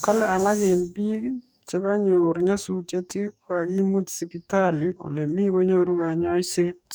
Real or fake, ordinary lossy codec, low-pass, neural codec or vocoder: fake; none; none; codec, 44.1 kHz, 2.6 kbps, DAC